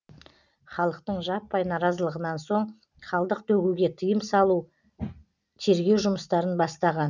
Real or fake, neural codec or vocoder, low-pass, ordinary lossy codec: real; none; 7.2 kHz; none